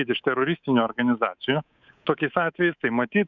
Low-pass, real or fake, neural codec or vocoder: 7.2 kHz; fake; codec, 24 kHz, 3.1 kbps, DualCodec